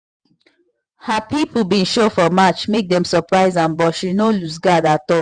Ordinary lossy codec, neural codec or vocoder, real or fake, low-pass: none; vocoder, 48 kHz, 128 mel bands, Vocos; fake; 9.9 kHz